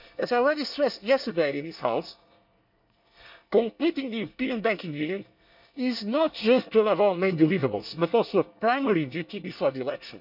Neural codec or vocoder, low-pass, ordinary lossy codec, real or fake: codec, 24 kHz, 1 kbps, SNAC; 5.4 kHz; none; fake